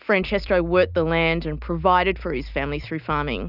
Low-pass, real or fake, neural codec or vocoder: 5.4 kHz; real; none